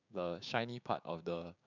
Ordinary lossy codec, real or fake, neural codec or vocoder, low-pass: none; fake; codec, 16 kHz, 6 kbps, DAC; 7.2 kHz